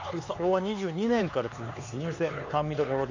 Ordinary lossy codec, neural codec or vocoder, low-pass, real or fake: AAC, 48 kbps; codec, 16 kHz, 4 kbps, X-Codec, HuBERT features, trained on LibriSpeech; 7.2 kHz; fake